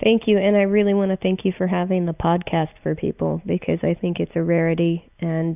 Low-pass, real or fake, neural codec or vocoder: 3.6 kHz; real; none